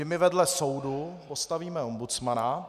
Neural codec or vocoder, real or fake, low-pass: none; real; 14.4 kHz